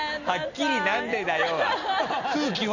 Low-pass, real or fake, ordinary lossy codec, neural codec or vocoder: 7.2 kHz; real; none; none